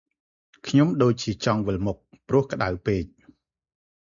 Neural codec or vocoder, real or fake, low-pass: none; real; 7.2 kHz